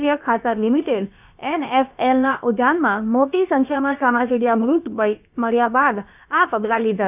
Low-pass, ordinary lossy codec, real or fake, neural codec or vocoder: 3.6 kHz; none; fake; codec, 16 kHz, about 1 kbps, DyCAST, with the encoder's durations